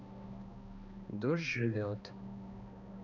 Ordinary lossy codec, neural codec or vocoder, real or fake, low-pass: none; codec, 16 kHz, 2 kbps, X-Codec, HuBERT features, trained on balanced general audio; fake; 7.2 kHz